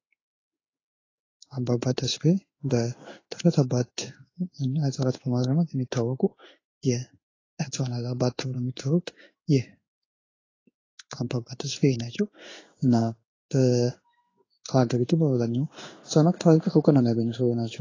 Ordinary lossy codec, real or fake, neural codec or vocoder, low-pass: AAC, 32 kbps; fake; codec, 16 kHz in and 24 kHz out, 1 kbps, XY-Tokenizer; 7.2 kHz